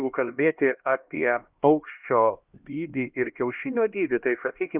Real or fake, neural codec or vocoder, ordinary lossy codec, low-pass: fake; codec, 16 kHz, 1 kbps, X-Codec, HuBERT features, trained on LibriSpeech; Opus, 32 kbps; 3.6 kHz